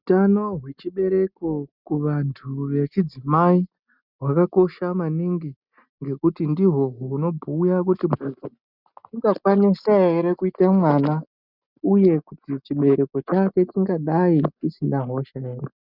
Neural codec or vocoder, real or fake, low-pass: none; real; 5.4 kHz